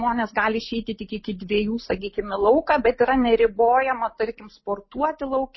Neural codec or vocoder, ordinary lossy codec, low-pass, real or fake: none; MP3, 24 kbps; 7.2 kHz; real